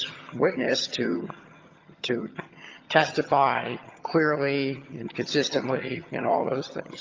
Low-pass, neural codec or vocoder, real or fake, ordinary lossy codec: 7.2 kHz; vocoder, 22.05 kHz, 80 mel bands, HiFi-GAN; fake; Opus, 24 kbps